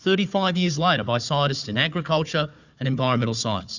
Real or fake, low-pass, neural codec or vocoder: fake; 7.2 kHz; codec, 16 kHz, 4 kbps, FunCodec, trained on Chinese and English, 50 frames a second